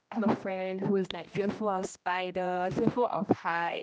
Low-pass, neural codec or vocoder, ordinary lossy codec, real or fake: none; codec, 16 kHz, 1 kbps, X-Codec, HuBERT features, trained on general audio; none; fake